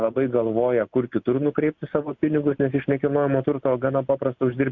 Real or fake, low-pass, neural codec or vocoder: real; 7.2 kHz; none